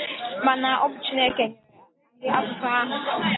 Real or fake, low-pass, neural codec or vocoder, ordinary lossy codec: real; 7.2 kHz; none; AAC, 16 kbps